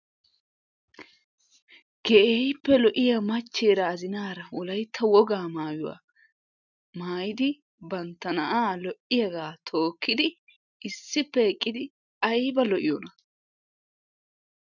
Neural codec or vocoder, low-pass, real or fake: none; 7.2 kHz; real